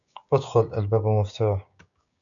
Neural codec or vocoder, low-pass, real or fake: codec, 16 kHz, 6 kbps, DAC; 7.2 kHz; fake